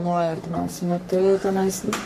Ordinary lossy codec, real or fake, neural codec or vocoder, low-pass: MP3, 64 kbps; fake; codec, 44.1 kHz, 3.4 kbps, Pupu-Codec; 14.4 kHz